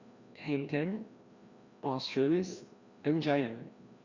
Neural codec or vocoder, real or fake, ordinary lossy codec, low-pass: codec, 16 kHz, 1 kbps, FreqCodec, larger model; fake; Opus, 64 kbps; 7.2 kHz